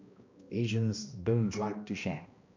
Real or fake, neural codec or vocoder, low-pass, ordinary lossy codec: fake; codec, 16 kHz, 1 kbps, X-Codec, HuBERT features, trained on balanced general audio; 7.2 kHz; MP3, 48 kbps